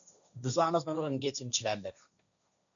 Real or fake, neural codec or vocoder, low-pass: fake; codec, 16 kHz, 1.1 kbps, Voila-Tokenizer; 7.2 kHz